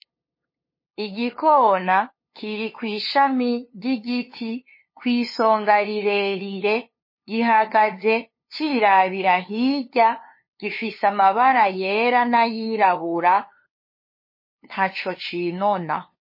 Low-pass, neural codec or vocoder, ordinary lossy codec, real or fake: 5.4 kHz; codec, 16 kHz, 2 kbps, FunCodec, trained on LibriTTS, 25 frames a second; MP3, 24 kbps; fake